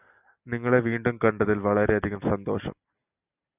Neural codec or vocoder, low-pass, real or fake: none; 3.6 kHz; real